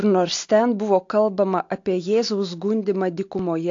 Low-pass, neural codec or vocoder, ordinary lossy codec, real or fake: 7.2 kHz; none; AAC, 48 kbps; real